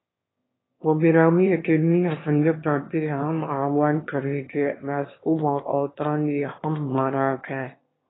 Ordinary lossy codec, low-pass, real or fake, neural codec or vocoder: AAC, 16 kbps; 7.2 kHz; fake; autoencoder, 22.05 kHz, a latent of 192 numbers a frame, VITS, trained on one speaker